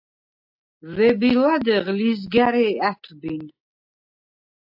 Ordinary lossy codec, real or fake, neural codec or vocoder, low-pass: AAC, 48 kbps; real; none; 5.4 kHz